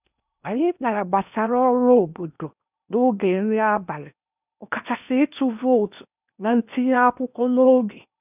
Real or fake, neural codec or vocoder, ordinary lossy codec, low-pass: fake; codec, 16 kHz in and 24 kHz out, 0.8 kbps, FocalCodec, streaming, 65536 codes; none; 3.6 kHz